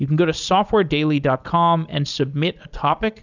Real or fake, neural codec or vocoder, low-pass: real; none; 7.2 kHz